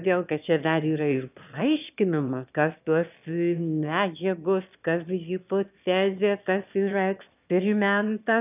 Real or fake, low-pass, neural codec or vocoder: fake; 3.6 kHz; autoencoder, 22.05 kHz, a latent of 192 numbers a frame, VITS, trained on one speaker